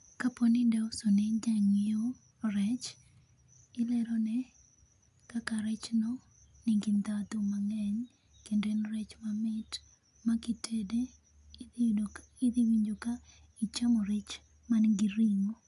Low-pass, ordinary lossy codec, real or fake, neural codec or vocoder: 10.8 kHz; AAC, 96 kbps; real; none